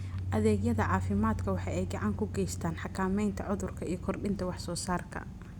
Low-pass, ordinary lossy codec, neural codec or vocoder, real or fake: 19.8 kHz; Opus, 64 kbps; none; real